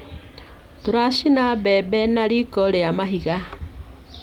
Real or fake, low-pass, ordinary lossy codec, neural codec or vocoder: real; 19.8 kHz; Opus, 24 kbps; none